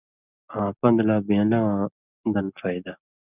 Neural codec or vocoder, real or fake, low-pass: none; real; 3.6 kHz